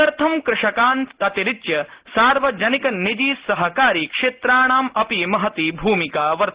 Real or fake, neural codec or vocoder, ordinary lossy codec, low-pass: real; none; Opus, 16 kbps; 3.6 kHz